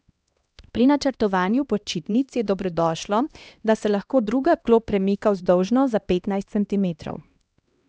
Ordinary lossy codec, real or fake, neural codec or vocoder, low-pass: none; fake; codec, 16 kHz, 1 kbps, X-Codec, HuBERT features, trained on LibriSpeech; none